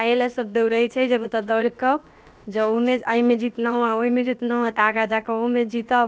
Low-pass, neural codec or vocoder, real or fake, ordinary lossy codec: none; codec, 16 kHz, about 1 kbps, DyCAST, with the encoder's durations; fake; none